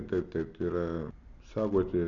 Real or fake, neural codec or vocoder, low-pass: real; none; 7.2 kHz